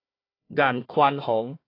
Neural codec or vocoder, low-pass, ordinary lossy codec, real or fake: codec, 16 kHz, 1 kbps, FunCodec, trained on Chinese and English, 50 frames a second; 5.4 kHz; AAC, 24 kbps; fake